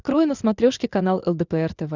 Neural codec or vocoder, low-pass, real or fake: none; 7.2 kHz; real